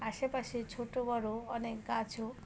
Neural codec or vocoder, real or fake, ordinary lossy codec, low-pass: none; real; none; none